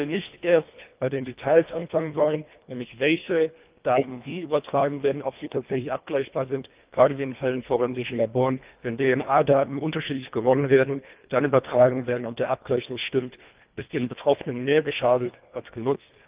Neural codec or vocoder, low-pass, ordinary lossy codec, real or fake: codec, 24 kHz, 1.5 kbps, HILCodec; 3.6 kHz; Opus, 24 kbps; fake